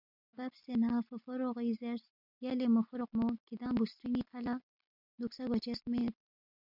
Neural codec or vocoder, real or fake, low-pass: none; real; 5.4 kHz